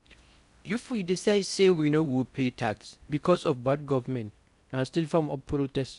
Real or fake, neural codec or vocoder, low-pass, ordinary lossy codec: fake; codec, 16 kHz in and 24 kHz out, 0.6 kbps, FocalCodec, streaming, 4096 codes; 10.8 kHz; none